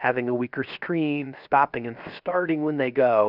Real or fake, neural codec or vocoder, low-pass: fake; codec, 16 kHz, 0.7 kbps, FocalCodec; 5.4 kHz